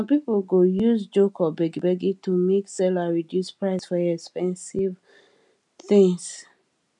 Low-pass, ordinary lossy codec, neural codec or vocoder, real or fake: 10.8 kHz; MP3, 96 kbps; none; real